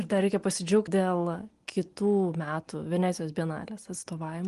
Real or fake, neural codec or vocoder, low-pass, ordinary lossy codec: real; none; 10.8 kHz; Opus, 24 kbps